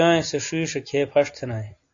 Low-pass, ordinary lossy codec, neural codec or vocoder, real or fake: 7.2 kHz; AAC, 64 kbps; none; real